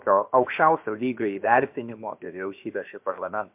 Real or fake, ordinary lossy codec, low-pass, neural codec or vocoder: fake; MP3, 32 kbps; 3.6 kHz; codec, 16 kHz, about 1 kbps, DyCAST, with the encoder's durations